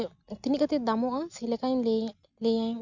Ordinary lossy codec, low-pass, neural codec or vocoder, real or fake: none; 7.2 kHz; none; real